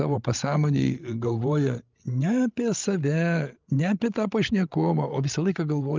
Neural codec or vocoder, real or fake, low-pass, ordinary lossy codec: codec, 16 kHz, 16 kbps, FreqCodec, larger model; fake; 7.2 kHz; Opus, 32 kbps